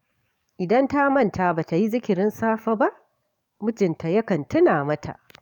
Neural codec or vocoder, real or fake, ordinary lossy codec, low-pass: codec, 44.1 kHz, 7.8 kbps, DAC; fake; none; 19.8 kHz